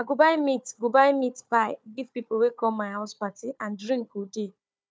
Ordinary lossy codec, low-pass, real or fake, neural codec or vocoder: none; none; fake; codec, 16 kHz, 4 kbps, FunCodec, trained on Chinese and English, 50 frames a second